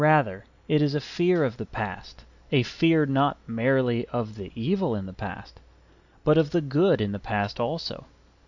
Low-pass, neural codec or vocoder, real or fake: 7.2 kHz; none; real